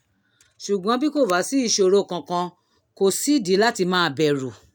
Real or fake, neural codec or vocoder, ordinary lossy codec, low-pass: real; none; none; none